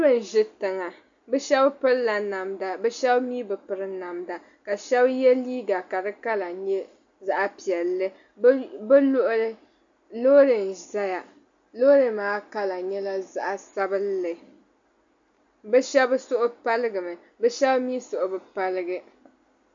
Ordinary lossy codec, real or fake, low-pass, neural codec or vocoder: AAC, 64 kbps; real; 7.2 kHz; none